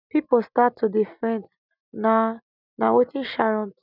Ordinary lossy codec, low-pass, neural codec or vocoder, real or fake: none; 5.4 kHz; none; real